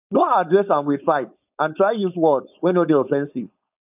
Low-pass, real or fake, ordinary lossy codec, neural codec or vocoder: 3.6 kHz; fake; none; codec, 16 kHz, 4.8 kbps, FACodec